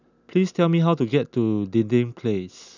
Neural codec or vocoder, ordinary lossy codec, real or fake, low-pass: none; none; real; 7.2 kHz